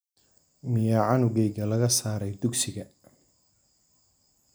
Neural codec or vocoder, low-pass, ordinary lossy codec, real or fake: none; none; none; real